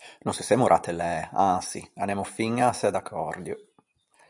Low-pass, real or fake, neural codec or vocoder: 10.8 kHz; real; none